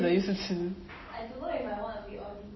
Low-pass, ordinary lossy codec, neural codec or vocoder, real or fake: 7.2 kHz; MP3, 24 kbps; none; real